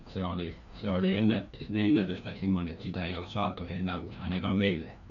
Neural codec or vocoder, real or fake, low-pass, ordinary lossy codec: codec, 16 kHz, 1 kbps, FreqCodec, larger model; fake; 7.2 kHz; none